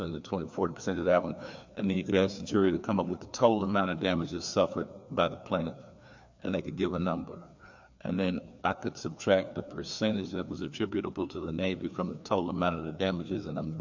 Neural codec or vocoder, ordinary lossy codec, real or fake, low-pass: codec, 16 kHz, 2 kbps, FreqCodec, larger model; MP3, 48 kbps; fake; 7.2 kHz